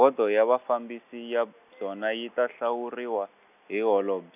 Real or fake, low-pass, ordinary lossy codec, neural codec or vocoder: real; 3.6 kHz; none; none